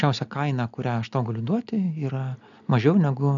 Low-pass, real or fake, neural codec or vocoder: 7.2 kHz; real; none